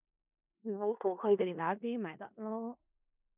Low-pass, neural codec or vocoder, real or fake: 3.6 kHz; codec, 16 kHz in and 24 kHz out, 0.4 kbps, LongCat-Audio-Codec, four codebook decoder; fake